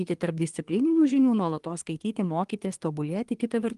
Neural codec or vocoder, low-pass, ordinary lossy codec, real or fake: codec, 24 kHz, 1 kbps, SNAC; 10.8 kHz; Opus, 24 kbps; fake